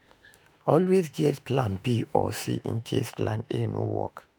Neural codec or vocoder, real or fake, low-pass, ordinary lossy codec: autoencoder, 48 kHz, 32 numbers a frame, DAC-VAE, trained on Japanese speech; fake; none; none